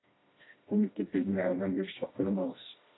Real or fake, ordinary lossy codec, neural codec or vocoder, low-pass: fake; AAC, 16 kbps; codec, 16 kHz, 1 kbps, FreqCodec, smaller model; 7.2 kHz